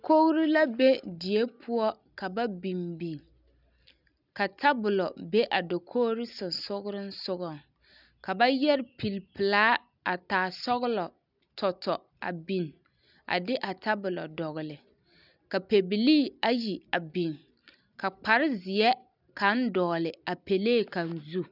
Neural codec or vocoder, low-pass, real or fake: none; 5.4 kHz; real